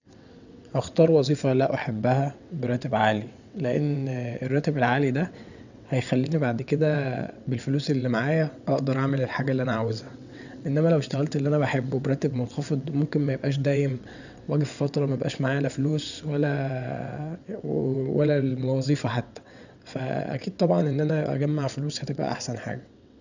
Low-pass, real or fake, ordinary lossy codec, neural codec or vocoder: 7.2 kHz; fake; none; vocoder, 22.05 kHz, 80 mel bands, WaveNeXt